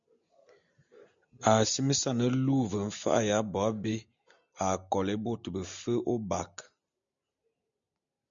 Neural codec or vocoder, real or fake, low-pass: none; real; 7.2 kHz